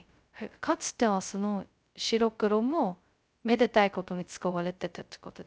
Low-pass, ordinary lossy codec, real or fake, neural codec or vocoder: none; none; fake; codec, 16 kHz, 0.2 kbps, FocalCodec